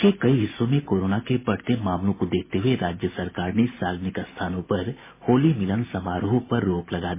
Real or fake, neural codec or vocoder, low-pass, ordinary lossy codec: real; none; 3.6 kHz; MP3, 16 kbps